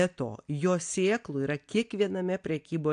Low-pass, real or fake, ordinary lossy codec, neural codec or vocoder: 9.9 kHz; real; AAC, 64 kbps; none